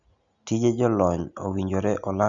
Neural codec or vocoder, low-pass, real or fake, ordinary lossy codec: none; 7.2 kHz; real; none